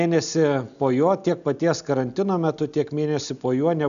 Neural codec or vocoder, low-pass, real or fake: none; 7.2 kHz; real